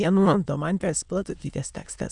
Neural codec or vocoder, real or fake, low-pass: autoencoder, 22.05 kHz, a latent of 192 numbers a frame, VITS, trained on many speakers; fake; 9.9 kHz